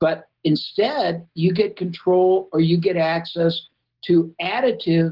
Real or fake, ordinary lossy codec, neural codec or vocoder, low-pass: real; Opus, 16 kbps; none; 5.4 kHz